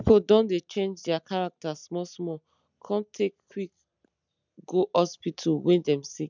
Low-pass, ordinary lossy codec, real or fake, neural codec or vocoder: 7.2 kHz; none; real; none